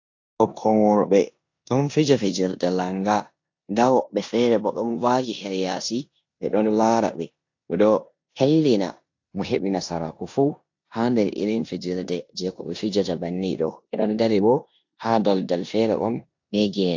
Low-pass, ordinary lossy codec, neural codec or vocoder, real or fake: 7.2 kHz; AAC, 48 kbps; codec, 16 kHz in and 24 kHz out, 0.9 kbps, LongCat-Audio-Codec, four codebook decoder; fake